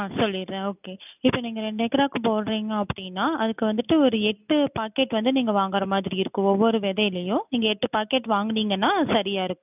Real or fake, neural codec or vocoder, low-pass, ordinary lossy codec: real; none; 3.6 kHz; none